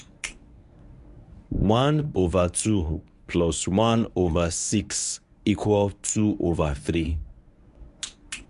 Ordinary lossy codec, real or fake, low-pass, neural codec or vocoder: none; fake; 10.8 kHz; codec, 24 kHz, 0.9 kbps, WavTokenizer, medium speech release version 1